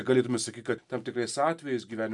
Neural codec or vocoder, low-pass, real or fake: none; 10.8 kHz; real